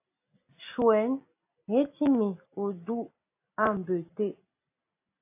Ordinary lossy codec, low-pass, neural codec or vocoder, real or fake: AAC, 24 kbps; 3.6 kHz; none; real